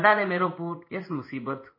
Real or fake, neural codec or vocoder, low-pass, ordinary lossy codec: fake; codec, 16 kHz in and 24 kHz out, 1 kbps, XY-Tokenizer; 5.4 kHz; MP3, 24 kbps